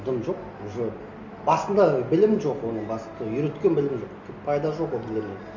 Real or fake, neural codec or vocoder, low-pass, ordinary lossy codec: real; none; 7.2 kHz; none